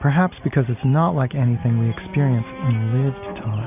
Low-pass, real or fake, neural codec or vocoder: 3.6 kHz; real; none